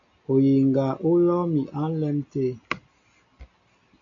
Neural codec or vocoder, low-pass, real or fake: none; 7.2 kHz; real